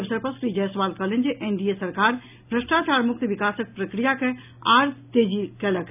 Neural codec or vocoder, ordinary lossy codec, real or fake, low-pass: none; none; real; 3.6 kHz